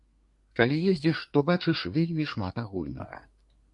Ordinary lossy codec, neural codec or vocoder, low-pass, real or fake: MP3, 48 kbps; codec, 24 kHz, 1 kbps, SNAC; 10.8 kHz; fake